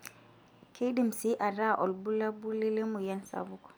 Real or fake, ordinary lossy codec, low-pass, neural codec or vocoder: real; none; none; none